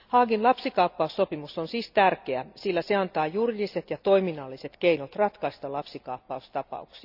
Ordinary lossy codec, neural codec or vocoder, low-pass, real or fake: none; none; 5.4 kHz; real